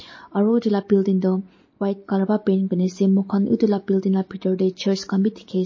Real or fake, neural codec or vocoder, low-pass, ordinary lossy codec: real; none; 7.2 kHz; MP3, 32 kbps